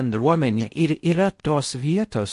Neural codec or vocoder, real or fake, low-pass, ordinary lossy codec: codec, 16 kHz in and 24 kHz out, 0.6 kbps, FocalCodec, streaming, 4096 codes; fake; 10.8 kHz; MP3, 48 kbps